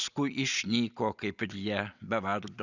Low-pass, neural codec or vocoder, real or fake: 7.2 kHz; none; real